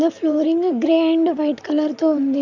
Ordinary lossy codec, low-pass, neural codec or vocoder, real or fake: none; 7.2 kHz; vocoder, 44.1 kHz, 128 mel bands, Pupu-Vocoder; fake